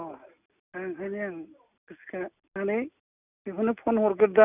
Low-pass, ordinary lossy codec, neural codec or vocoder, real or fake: 3.6 kHz; none; none; real